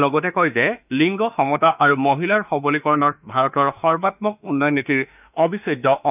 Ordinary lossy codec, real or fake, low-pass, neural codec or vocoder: none; fake; 3.6 kHz; autoencoder, 48 kHz, 32 numbers a frame, DAC-VAE, trained on Japanese speech